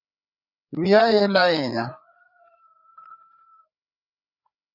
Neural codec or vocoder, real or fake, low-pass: codec, 16 kHz, 4 kbps, FreqCodec, larger model; fake; 5.4 kHz